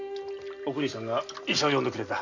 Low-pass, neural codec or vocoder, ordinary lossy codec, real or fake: 7.2 kHz; none; none; real